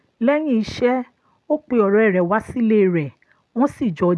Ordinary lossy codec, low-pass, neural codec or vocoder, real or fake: none; none; none; real